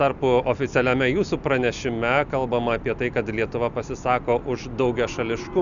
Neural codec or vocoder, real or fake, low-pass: none; real; 7.2 kHz